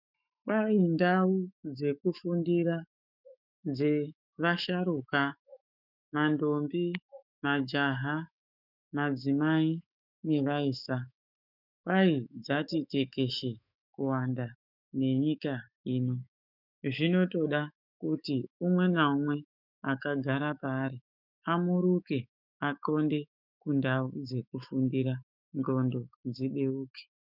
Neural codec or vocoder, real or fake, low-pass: autoencoder, 48 kHz, 128 numbers a frame, DAC-VAE, trained on Japanese speech; fake; 5.4 kHz